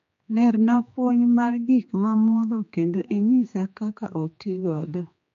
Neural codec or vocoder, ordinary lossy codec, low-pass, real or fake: codec, 16 kHz, 2 kbps, X-Codec, HuBERT features, trained on general audio; MP3, 48 kbps; 7.2 kHz; fake